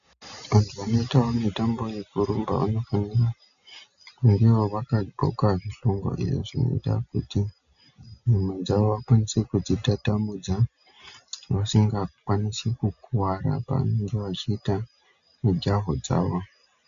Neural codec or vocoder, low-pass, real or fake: none; 7.2 kHz; real